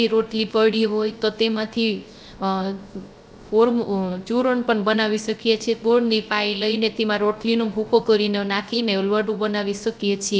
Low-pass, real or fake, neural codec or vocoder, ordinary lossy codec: none; fake; codec, 16 kHz, 0.3 kbps, FocalCodec; none